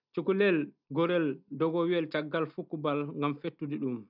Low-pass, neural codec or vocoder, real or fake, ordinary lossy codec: 5.4 kHz; none; real; none